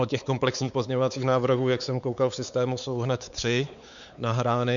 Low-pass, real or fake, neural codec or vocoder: 7.2 kHz; fake; codec, 16 kHz, 4 kbps, X-Codec, WavLM features, trained on Multilingual LibriSpeech